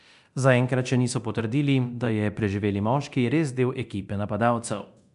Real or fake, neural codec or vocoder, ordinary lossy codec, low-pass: fake; codec, 24 kHz, 0.9 kbps, DualCodec; none; 10.8 kHz